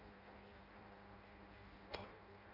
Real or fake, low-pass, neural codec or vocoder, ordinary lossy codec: fake; 5.4 kHz; codec, 16 kHz in and 24 kHz out, 0.6 kbps, FireRedTTS-2 codec; none